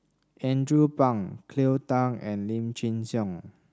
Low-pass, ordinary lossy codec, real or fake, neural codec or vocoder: none; none; real; none